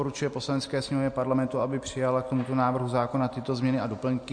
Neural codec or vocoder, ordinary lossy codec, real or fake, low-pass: none; MP3, 48 kbps; real; 9.9 kHz